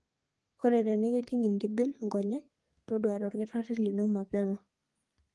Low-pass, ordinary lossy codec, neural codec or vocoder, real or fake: 10.8 kHz; Opus, 24 kbps; codec, 32 kHz, 1.9 kbps, SNAC; fake